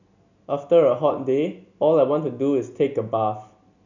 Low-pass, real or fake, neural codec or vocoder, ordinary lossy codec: 7.2 kHz; real; none; none